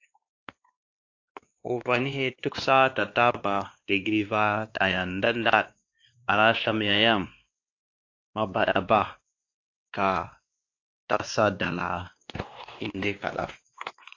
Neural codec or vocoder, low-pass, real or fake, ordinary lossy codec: codec, 16 kHz, 2 kbps, X-Codec, WavLM features, trained on Multilingual LibriSpeech; 7.2 kHz; fake; AAC, 48 kbps